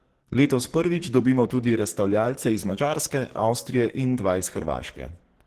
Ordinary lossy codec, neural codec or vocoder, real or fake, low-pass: Opus, 16 kbps; codec, 44.1 kHz, 2.6 kbps, SNAC; fake; 14.4 kHz